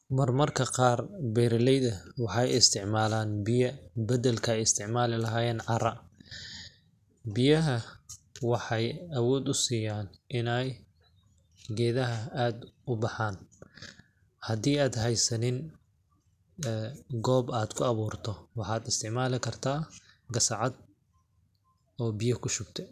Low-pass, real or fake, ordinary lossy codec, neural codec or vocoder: 14.4 kHz; real; none; none